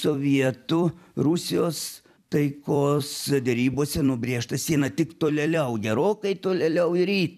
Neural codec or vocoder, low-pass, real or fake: vocoder, 44.1 kHz, 128 mel bands every 256 samples, BigVGAN v2; 14.4 kHz; fake